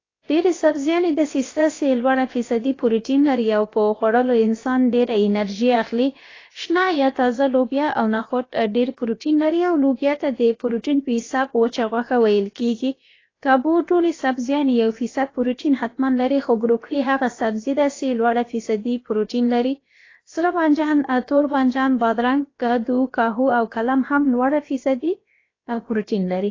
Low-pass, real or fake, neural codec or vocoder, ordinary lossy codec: 7.2 kHz; fake; codec, 16 kHz, about 1 kbps, DyCAST, with the encoder's durations; AAC, 32 kbps